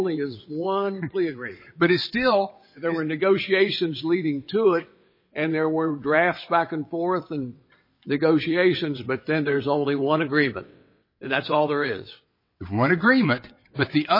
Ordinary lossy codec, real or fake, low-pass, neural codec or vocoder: MP3, 24 kbps; fake; 5.4 kHz; vocoder, 22.05 kHz, 80 mel bands, WaveNeXt